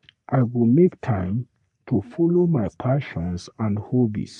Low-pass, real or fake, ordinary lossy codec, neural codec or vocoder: 10.8 kHz; fake; none; codec, 44.1 kHz, 3.4 kbps, Pupu-Codec